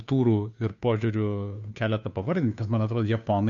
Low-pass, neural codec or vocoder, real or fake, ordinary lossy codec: 7.2 kHz; codec, 16 kHz, 2 kbps, FunCodec, trained on Chinese and English, 25 frames a second; fake; MP3, 96 kbps